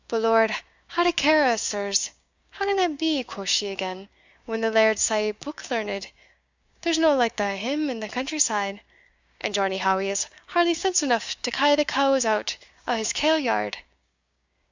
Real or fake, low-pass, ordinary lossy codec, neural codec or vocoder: fake; 7.2 kHz; Opus, 64 kbps; codec, 16 kHz, 6 kbps, DAC